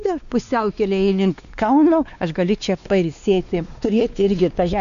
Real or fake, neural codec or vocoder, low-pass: fake; codec, 16 kHz, 2 kbps, X-Codec, WavLM features, trained on Multilingual LibriSpeech; 7.2 kHz